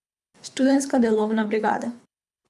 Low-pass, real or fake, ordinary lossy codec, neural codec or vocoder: none; fake; none; codec, 24 kHz, 3 kbps, HILCodec